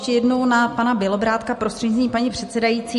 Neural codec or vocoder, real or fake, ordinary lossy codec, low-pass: none; real; MP3, 48 kbps; 14.4 kHz